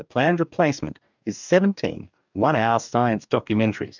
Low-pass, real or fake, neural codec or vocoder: 7.2 kHz; fake; codec, 44.1 kHz, 2.6 kbps, DAC